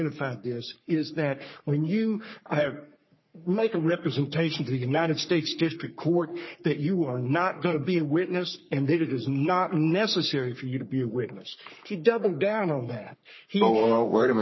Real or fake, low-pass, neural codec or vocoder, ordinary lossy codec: fake; 7.2 kHz; codec, 44.1 kHz, 3.4 kbps, Pupu-Codec; MP3, 24 kbps